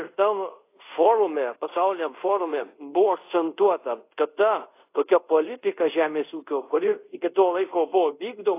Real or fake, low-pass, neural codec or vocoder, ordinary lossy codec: fake; 3.6 kHz; codec, 24 kHz, 0.5 kbps, DualCodec; AAC, 24 kbps